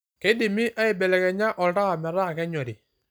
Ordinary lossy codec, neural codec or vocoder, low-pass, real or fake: none; none; none; real